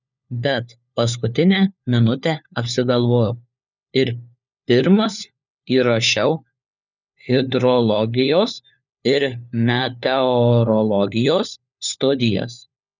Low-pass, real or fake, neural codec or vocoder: 7.2 kHz; fake; codec, 16 kHz, 4 kbps, FunCodec, trained on LibriTTS, 50 frames a second